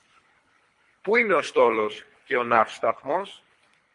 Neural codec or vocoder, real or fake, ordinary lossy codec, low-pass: codec, 24 kHz, 3 kbps, HILCodec; fake; MP3, 48 kbps; 10.8 kHz